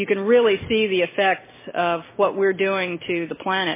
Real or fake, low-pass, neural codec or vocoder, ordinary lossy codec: real; 3.6 kHz; none; MP3, 16 kbps